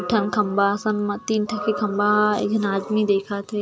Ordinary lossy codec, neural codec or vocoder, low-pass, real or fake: none; none; none; real